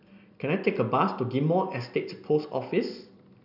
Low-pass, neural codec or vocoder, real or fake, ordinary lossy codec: 5.4 kHz; none; real; none